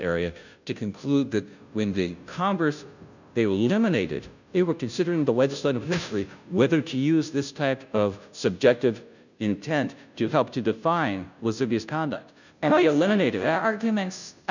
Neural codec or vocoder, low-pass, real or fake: codec, 16 kHz, 0.5 kbps, FunCodec, trained on Chinese and English, 25 frames a second; 7.2 kHz; fake